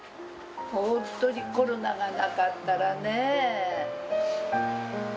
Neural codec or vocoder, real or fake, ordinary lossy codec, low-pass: none; real; none; none